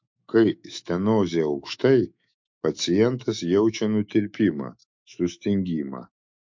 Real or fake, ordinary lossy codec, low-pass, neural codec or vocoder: fake; MP3, 48 kbps; 7.2 kHz; autoencoder, 48 kHz, 128 numbers a frame, DAC-VAE, trained on Japanese speech